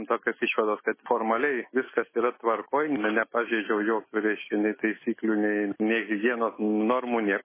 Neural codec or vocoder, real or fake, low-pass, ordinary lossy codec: autoencoder, 48 kHz, 128 numbers a frame, DAC-VAE, trained on Japanese speech; fake; 3.6 kHz; MP3, 16 kbps